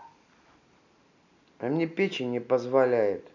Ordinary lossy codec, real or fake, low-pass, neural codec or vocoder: none; real; 7.2 kHz; none